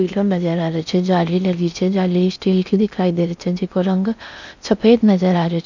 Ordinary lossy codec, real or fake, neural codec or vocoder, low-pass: none; fake; codec, 16 kHz in and 24 kHz out, 0.6 kbps, FocalCodec, streaming, 4096 codes; 7.2 kHz